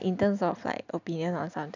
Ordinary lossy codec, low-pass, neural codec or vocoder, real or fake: none; 7.2 kHz; none; real